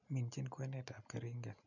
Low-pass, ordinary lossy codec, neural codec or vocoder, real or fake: 7.2 kHz; none; none; real